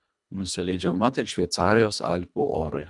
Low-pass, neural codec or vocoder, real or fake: 10.8 kHz; codec, 24 kHz, 1.5 kbps, HILCodec; fake